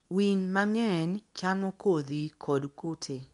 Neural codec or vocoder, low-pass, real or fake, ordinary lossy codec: codec, 24 kHz, 0.9 kbps, WavTokenizer, medium speech release version 2; 10.8 kHz; fake; none